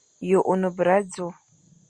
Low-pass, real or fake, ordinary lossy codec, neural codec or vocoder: 9.9 kHz; real; Opus, 64 kbps; none